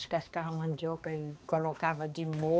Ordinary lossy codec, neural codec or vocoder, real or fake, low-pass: none; codec, 16 kHz, 2 kbps, X-Codec, HuBERT features, trained on balanced general audio; fake; none